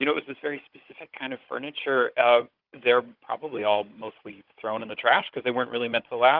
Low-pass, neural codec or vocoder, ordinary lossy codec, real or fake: 5.4 kHz; codec, 16 kHz, 16 kbps, FunCodec, trained on Chinese and English, 50 frames a second; Opus, 32 kbps; fake